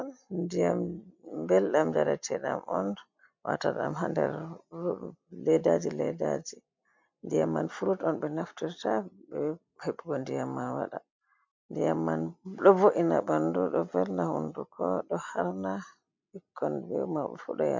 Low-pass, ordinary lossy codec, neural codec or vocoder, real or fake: 7.2 kHz; MP3, 64 kbps; none; real